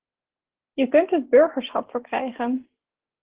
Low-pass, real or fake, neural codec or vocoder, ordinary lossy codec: 3.6 kHz; real; none; Opus, 16 kbps